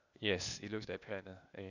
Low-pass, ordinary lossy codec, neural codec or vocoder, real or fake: 7.2 kHz; none; codec, 16 kHz, 0.8 kbps, ZipCodec; fake